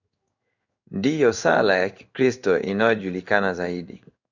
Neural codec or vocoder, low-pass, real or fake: codec, 16 kHz in and 24 kHz out, 1 kbps, XY-Tokenizer; 7.2 kHz; fake